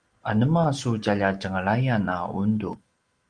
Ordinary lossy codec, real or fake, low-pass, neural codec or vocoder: Opus, 24 kbps; real; 9.9 kHz; none